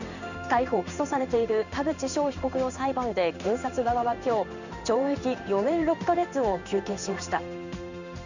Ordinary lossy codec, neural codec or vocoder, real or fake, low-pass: none; codec, 16 kHz in and 24 kHz out, 1 kbps, XY-Tokenizer; fake; 7.2 kHz